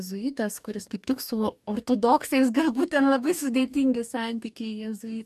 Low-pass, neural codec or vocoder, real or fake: 14.4 kHz; codec, 44.1 kHz, 2.6 kbps, DAC; fake